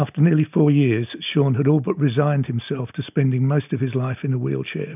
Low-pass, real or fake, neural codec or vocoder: 3.6 kHz; real; none